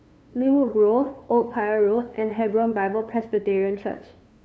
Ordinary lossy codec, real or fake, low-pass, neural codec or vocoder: none; fake; none; codec, 16 kHz, 2 kbps, FunCodec, trained on LibriTTS, 25 frames a second